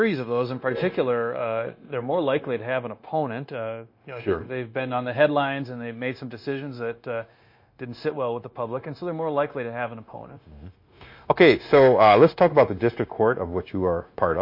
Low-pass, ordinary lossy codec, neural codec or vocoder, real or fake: 5.4 kHz; MP3, 32 kbps; codec, 16 kHz, 0.9 kbps, LongCat-Audio-Codec; fake